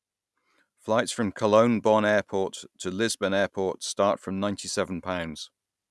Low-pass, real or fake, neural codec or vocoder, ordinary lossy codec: none; real; none; none